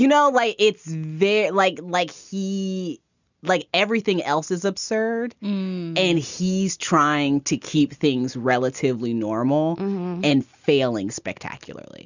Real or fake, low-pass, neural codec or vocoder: real; 7.2 kHz; none